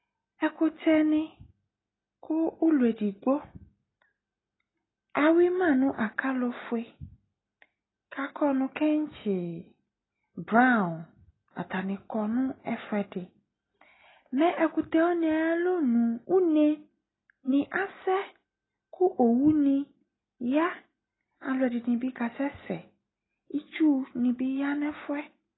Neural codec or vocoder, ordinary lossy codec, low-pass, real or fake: none; AAC, 16 kbps; 7.2 kHz; real